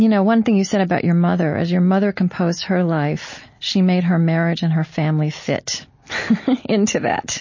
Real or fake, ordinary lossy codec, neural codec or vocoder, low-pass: real; MP3, 32 kbps; none; 7.2 kHz